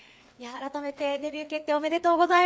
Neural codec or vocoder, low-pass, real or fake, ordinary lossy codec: codec, 16 kHz, 4 kbps, FreqCodec, larger model; none; fake; none